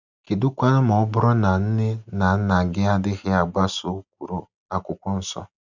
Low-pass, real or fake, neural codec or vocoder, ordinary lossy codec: 7.2 kHz; real; none; none